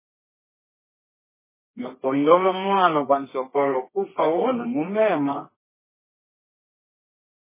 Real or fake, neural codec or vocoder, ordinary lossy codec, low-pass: fake; codec, 24 kHz, 0.9 kbps, WavTokenizer, medium music audio release; MP3, 16 kbps; 3.6 kHz